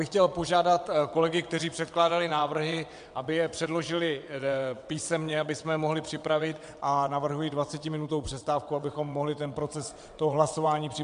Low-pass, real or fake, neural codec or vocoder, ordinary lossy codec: 9.9 kHz; fake; vocoder, 22.05 kHz, 80 mel bands, WaveNeXt; MP3, 64 kbps